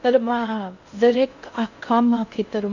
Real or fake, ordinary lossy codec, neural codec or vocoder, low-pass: fake; none; codec, 16 kHz in and 24 kHz out, 0.6 kbps, FocalCodec, streaming, 2048 codes; 7.2 kHz